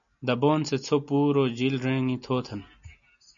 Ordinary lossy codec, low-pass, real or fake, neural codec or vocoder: MP3, 96 kbps; 7.2 kHz; real; none